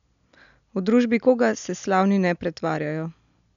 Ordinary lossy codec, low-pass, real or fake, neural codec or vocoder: none; 7.2 kHz; real; none